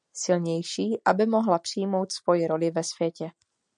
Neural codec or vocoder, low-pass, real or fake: none; 9.9 kHz; real